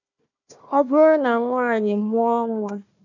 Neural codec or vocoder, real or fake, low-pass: codec, 16 kHz, 1 kbps, FunCodec, trained on Chinese and English, 50 frames a second; fake; 7.2 kHz